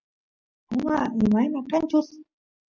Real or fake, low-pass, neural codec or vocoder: real; 7.2 kHz; none